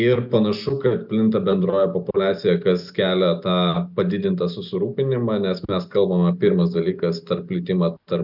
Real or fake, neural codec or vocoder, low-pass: real; none; 5.4 kHz